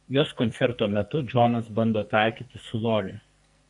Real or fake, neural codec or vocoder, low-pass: fake; codec, 44.1 kHz, 2.6 kbps, SNAC; 10.8 kHz